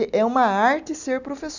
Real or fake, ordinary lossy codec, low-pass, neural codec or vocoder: real; none; 7.2 kHz; none